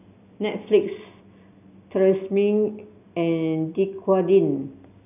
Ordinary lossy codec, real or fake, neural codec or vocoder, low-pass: none; real; none; 3.6 kHz